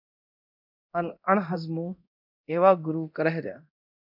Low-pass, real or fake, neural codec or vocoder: 5.4 kHz; fake; codec, 24 kHz, 0.9 kbps, DualCodec